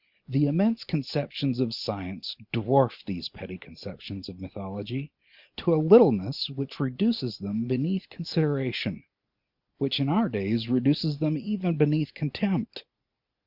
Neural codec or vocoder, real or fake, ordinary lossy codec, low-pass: none; real; Opus, 64 kbps; 5.4 kHz